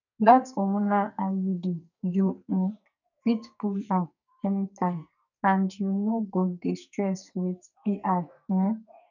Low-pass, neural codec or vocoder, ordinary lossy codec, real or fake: 7.2 kHz; codec, 44.1 kHz, 2.6 kbps, SNAC; none; fake